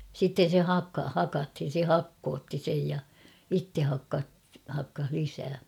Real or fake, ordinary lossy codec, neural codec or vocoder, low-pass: real; none; none; 19.8 kHz